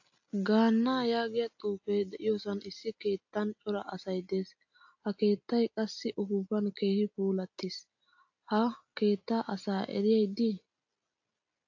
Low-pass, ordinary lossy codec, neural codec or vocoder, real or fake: 7.2 kHz; AAC, 48 kbps; none; real